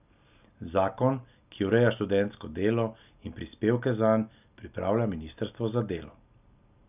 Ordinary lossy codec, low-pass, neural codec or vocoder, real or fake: none; 3.6 kHz; none; real